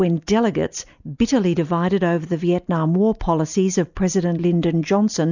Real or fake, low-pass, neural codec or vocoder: real; 7.2 kHz; none